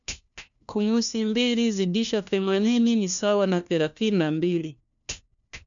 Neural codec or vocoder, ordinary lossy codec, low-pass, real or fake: codec, 16 kHz, 1 kbps, FunCodec, trained on LibriTTS, 50 frames a second; none; 7.2 kHz; fake